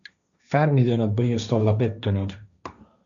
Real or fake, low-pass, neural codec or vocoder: fake; 7.2 kHz; codec, 16 kHz, 1.1 kbps, Voila-Tokenizer